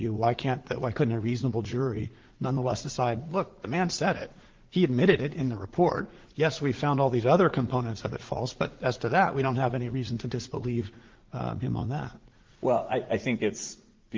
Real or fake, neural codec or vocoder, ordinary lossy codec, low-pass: fake; vocoder, 44.1 kHz, 80 mel bands, Vocos; Opus, 16 kbps; 7.2 kHz